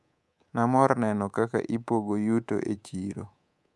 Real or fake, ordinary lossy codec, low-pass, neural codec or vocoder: fake; none; none; codec, 24 kHz, 3.1 kbps, DualCodec